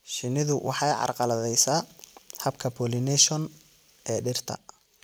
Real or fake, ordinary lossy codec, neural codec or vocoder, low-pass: real; none; none; none